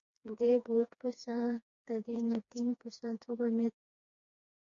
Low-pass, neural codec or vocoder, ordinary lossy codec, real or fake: 7.2 kHz; codec, 16 kHz, 2 kbps, FreqCodec, smaller model; MP3, 48 kbps; fake